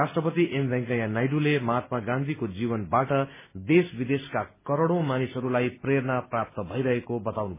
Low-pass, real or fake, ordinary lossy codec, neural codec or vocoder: 3.6 kHz; real; MP3, 16 kbps; none